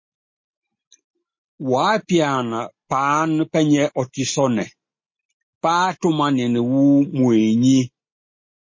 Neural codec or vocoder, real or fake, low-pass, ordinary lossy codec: none; real; 7.2 kHz; MP3, 32 kbps